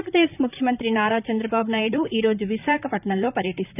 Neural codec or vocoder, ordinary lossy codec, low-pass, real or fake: vocoder, 44.1 kHz, 128 mel bands, Pupu-Vocoder; none; 3.6 kHz; fake